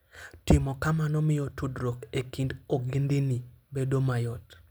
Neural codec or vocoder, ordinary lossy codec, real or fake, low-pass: none; none; real; none